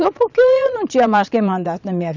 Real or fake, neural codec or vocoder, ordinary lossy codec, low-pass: fake; vocoder, 22.05 kHz, 80 mel bands, Vocos; none; 7.2 kHz